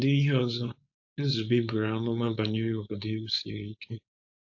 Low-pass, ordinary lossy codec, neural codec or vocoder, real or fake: 7.2 kHz; AAC, 48 kbps; codec, 16 kHz, 4.8 kbps, FACodec; fake